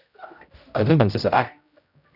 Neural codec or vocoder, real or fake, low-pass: codec, 16 kHz, 0.5 kbps, X-Codec, HuBERT features, trained on general audio; fake; 5.4 kHz